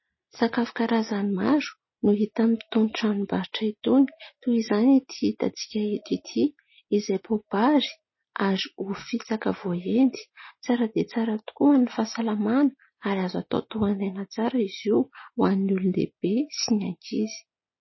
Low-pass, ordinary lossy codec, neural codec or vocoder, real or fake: 7.2 kHz; MP3, 24 kbps; none; real